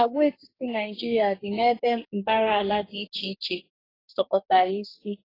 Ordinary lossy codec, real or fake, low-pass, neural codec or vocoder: AAC, 24 kbps; fake; 5.4 kHz; codec, 44.1 kHz, 2.6 kbps, DAC